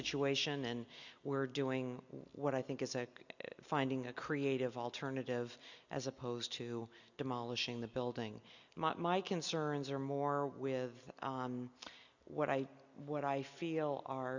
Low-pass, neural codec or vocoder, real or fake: 7.2 kHz; none; real